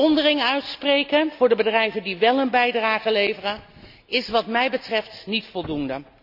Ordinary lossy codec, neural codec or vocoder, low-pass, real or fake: AAC, 48 kbps; none; 5.4 kHz; real